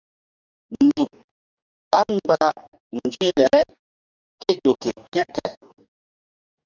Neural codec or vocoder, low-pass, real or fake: codec, 44.1 kHz, 2.6 kbps, DAC; 7.2 kHz; fake